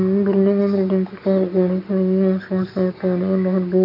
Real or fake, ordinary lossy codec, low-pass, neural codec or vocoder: real; none; 5.4 kHz; none